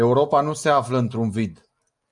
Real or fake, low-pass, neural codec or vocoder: real; 10.8 kHz; none